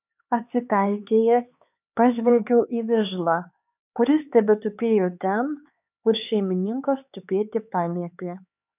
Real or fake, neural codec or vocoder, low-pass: fake; codec, 16 kHz, 4 kbps, X-Codec, HuBERT features, trained on LibriSpeech; 3.6 kHz